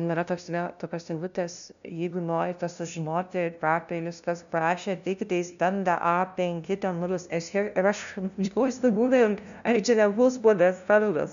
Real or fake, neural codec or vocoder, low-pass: fake; codec, 16 kHz, 0.5 kbps, FunCodec, trained on LibriTTS, 25 frames a second; 7.2 kHz